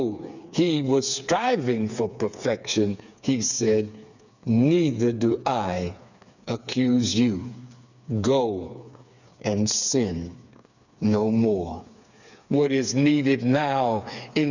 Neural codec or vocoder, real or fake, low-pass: codec, 16 kHz, 4 kbps, FreqCodec, smaller model; fake; 7.2 kHz